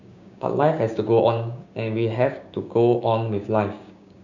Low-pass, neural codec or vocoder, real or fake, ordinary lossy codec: 7.2 kHz; codec, 16 kHz, 6 kbps, DAC; fake; none